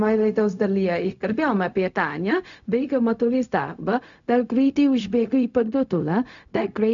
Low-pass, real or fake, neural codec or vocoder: 7.2 kHz; fake; codec, 16 kHz, 0.4 kbps, LongCat-Audio-Codec